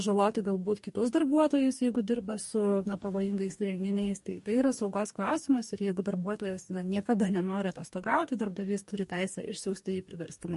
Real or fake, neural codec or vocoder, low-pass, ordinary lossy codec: fake; codec, 44.1 kHz, 2.6 kbps, DAC; 14.4 kHz; MP3, 48 kbps